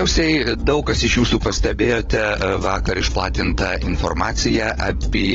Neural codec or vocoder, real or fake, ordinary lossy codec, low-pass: codec, 16 kHz, 16 kbps, FunCodec, trained on LibriTTS, 50 frames a second; fake; AAC, 24 kbps; 7.2 kHz